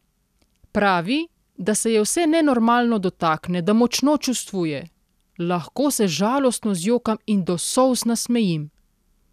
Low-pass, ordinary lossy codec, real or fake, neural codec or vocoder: 14.4 kHz; none; real; none